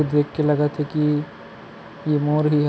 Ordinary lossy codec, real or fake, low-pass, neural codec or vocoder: none; real; none; none